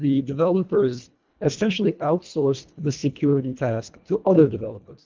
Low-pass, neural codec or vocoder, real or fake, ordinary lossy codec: 7.2 kHz; codec, 24 kHz, 1.5 kbps, HILCodec; fake; Opus, 32 kbps